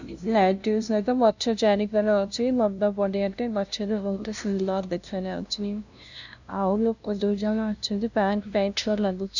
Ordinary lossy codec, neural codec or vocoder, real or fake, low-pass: none; codec, 16 kHz, 0.5 kbps, FunCodec, trained on LibriTTS, 25 frames a second; fake; 7.2 kHz